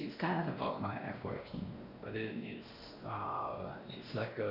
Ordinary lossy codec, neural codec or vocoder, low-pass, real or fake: none; codec, 16 kHz, 1 kbps, X-Codec, WavLM features, trained on Multilingual LibriSpeech; 5.4 kHz; fake